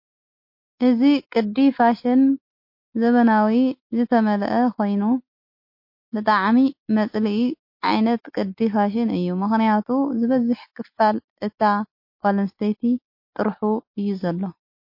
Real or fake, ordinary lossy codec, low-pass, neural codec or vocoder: real; MP3, 32 kbps; 5.4 kHz; none